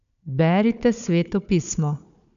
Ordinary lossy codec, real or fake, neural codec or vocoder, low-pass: none; fake; codec, 16 kHz, 4 kbps, FunCodec, trained on Chinese and English, 50 frames a second; 7.2 kHz